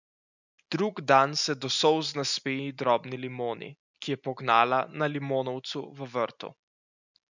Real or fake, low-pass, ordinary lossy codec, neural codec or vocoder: real; 7.2 kHz; none; none